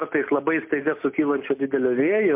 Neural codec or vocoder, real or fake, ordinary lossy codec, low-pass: none; real; MP3, 32 kbps; 3.6 kHz